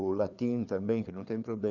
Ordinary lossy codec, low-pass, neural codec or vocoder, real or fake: none; 7.2 kHz; codec, 16 kHz, 4 kbps, FreqCodec, larger model; fake